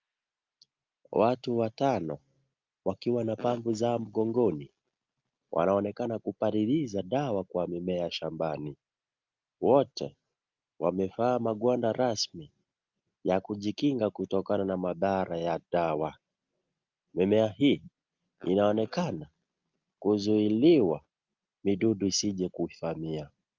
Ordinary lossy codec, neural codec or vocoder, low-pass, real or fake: Opus, 24 kbps; none; 7.2 kHz; real